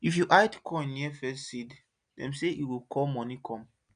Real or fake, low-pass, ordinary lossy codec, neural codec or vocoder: real; 9.9 kHz; none; none